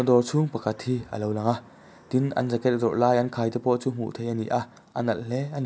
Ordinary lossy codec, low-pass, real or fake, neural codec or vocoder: none; none; real; none